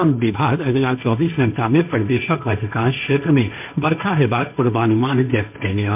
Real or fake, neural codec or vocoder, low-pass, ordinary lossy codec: fake; codec, 16 kHz, 1.1 kbps, Voila-Tokenizer; 3.6 kHz; MP3, 32 kbps